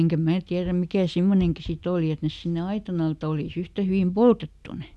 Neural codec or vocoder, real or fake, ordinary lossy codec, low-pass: none; real; none; none